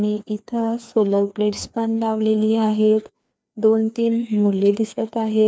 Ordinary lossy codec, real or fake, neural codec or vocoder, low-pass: none; fake; codec, 16 kHz, 2 kbps, FreqCodec, larger model; none